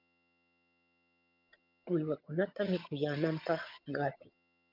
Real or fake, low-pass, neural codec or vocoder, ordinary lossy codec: fake; 5.4 kHz; vocoder, 22.05 kHz, 80 mel bands, HiFi-GAN; MP3, 48 kbps